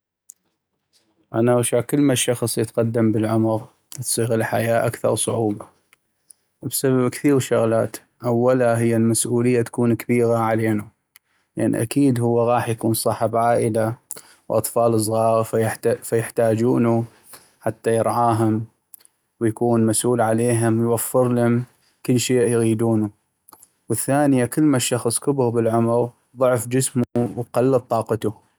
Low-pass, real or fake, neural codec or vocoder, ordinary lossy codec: none; real; none; none